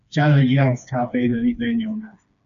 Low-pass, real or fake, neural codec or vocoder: 7.2 kHz; fake; codec, 16 kHz, 2 kbps, FreqCodec, smaller model